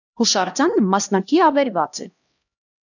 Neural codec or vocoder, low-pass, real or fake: codec, 16 kHz, 1 kbps, X-Codec, HuBERT features, trained on LibriSpeech; 7.2 kHz; fake